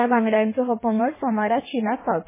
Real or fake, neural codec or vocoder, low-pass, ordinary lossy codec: fake; codec, 16 kHz in and 24 kHz out, 1.1 kbps, FireRedTTS-2 codec; 3.6 kHz; MP3, 16 kbps